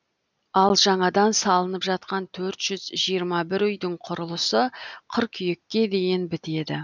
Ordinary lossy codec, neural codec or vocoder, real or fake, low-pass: none; none; real; 7.2 kHz